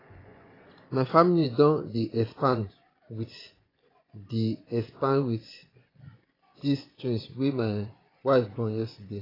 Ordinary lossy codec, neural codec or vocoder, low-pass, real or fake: AAC, 24 kbps; vocoder, 24 kHz, 100 mel bands, Vocos; 5.4 kHz; fake